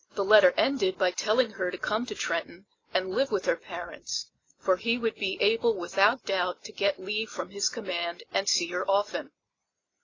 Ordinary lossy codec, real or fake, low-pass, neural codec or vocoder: AAC, 32 kbps; real; 7.2 kHz; none